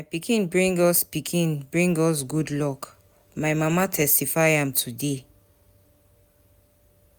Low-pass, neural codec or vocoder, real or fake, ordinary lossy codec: none; none; real; none